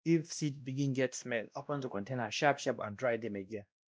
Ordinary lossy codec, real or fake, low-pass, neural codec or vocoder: none; fake; none; codec, 16 kHz, 1 kbps, X-Codec, WavLM features, trained on Multilingual LibriSpeech